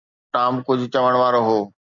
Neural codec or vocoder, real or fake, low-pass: none; real; 7.2 kHz